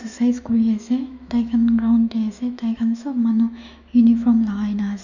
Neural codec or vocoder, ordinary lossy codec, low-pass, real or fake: autoencoder, 48 kHz, 128 numbers a frame, DAC-VAE, trained on Japanese speech; none; 7.2 kHz; fake